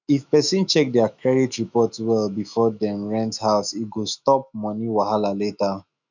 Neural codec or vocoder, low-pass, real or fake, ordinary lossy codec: autoencoder, 48 kHz, 128 numbers a frame, DAC-VAE, trained on Japanese speech; 7.2 kHz; fake; none